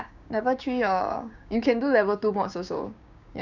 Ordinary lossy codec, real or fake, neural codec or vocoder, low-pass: none; fake; codec, 16 kHz, 6 kbps, DAC; 7.2 kHz